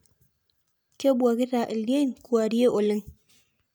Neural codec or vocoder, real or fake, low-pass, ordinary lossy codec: none; real; none; none